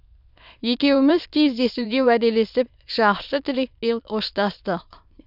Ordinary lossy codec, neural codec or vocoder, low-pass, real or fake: none; autoencoder, 22.05 kHz, a latent of 192 numbers a frame, VITS, trained on many speakers; 5.4 kHz; fake